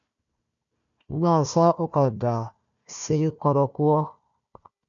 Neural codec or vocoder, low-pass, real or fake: codec, 16 kHz, 1 kbps, FunCodec, trained on Chinese and English, 50 frames a second; 7.2 kHz; fake